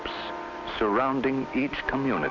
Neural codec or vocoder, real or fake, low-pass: none; real; 7.2 kHz